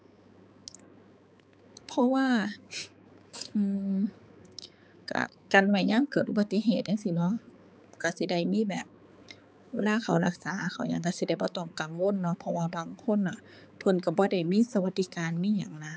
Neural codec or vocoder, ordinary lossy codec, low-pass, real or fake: codec, 16 kHz, 4 kbps, X-Codec, HuBERT features, trained on balanced general audio; none; none; fake